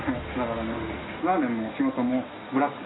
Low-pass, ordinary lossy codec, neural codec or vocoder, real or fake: 7.2 kHz; AAC, 16 kbps; none; real